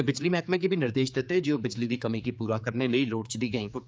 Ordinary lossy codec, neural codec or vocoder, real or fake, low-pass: none; codec, 16 kHz, 4 kbps, X-Codec, HuBERT features, trained on general audio; fake; none